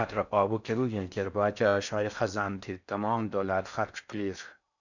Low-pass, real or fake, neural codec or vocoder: 7.2 kHz; fake; codec, 16 kHz in and 24 kHz out, 0.6 kbps, FocalCodec, streaming, 4096 codes